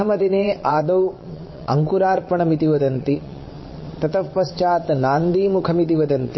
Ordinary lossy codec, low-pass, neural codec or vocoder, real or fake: MP3, 24 kbps; 7.2 kHz; vocoder, 22.05 kHz, 80 mel bands, WaveNeXt; fake